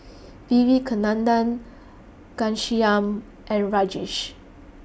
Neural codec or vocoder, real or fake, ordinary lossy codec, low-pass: none; real; none; none